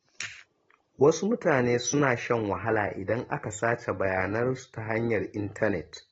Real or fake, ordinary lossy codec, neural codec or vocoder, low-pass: real; AAC, 24 kbps; none; 19.8 kHz